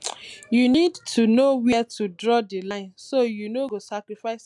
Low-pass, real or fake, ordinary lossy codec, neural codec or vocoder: none; real; none; none